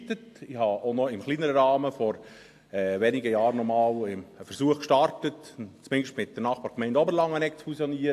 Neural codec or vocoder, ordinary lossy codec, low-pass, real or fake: none; AAC, 64 kbps; 14.4 kHz; real